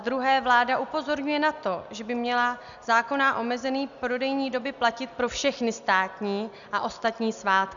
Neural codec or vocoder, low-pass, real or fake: none; 7.2 kHz; real